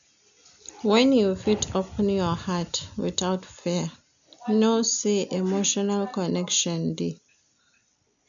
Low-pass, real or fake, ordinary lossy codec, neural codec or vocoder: 7.2 kHz; real; none; none